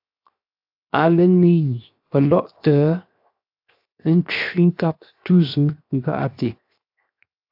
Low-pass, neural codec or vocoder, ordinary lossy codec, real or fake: 5.4 kHz; codec, 16 kHz, 0.7 kbps, FocalCodec; AAC, 48 kbps; fake